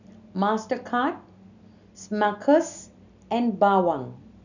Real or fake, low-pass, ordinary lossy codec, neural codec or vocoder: real; 7.2 kHz; none; none